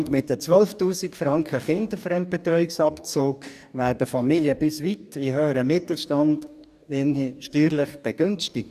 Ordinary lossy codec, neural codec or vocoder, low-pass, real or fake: none; codec, 44.1 kHz, 2.6 kbps, DAC; 14.4 kHz; fake